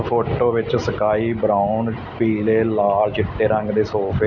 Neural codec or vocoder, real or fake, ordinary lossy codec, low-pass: none; real; none; 7.2 kHz